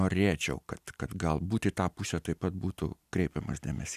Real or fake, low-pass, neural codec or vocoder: real; 14.4 kHz; none